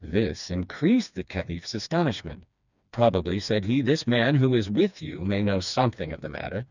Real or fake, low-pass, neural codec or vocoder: fake; 7.2 kHz; codec, 16 kHz, 2 kbps, FreqCodec, smaller model